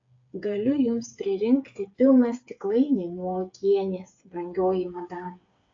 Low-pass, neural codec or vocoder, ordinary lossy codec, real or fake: 7.2 kHz; codec, 16 kHz, 8 kbps, FreqCodec, smaller model; AAC, 48 kbps; fake